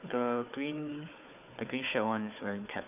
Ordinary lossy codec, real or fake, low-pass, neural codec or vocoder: none; fake; 3.6 kHz; codec, 16 kHz, 4 kbps, FunCodec, trained on Chinese and English, 50 frames a second